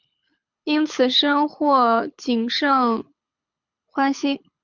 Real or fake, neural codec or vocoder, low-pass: fake; codec, 24 kHz, 6 kbps, HILCodec; 7.2 kHz